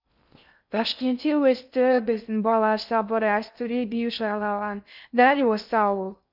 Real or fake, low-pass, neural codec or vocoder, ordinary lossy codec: fake; 5.4 kHz; codec, 16 kHz in and 24 kHz out, 0.6 kbps, FocalCodec, streaming, 2048 codes; none